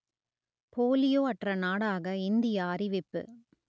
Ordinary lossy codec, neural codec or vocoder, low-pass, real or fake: none; none; none; real